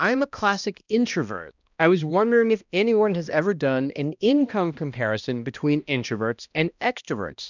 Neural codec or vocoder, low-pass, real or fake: codec, 16 kHz, 1 kbps, X-Codec, HuBERT features, trained on balanced general audio; 7.2 kHz; fake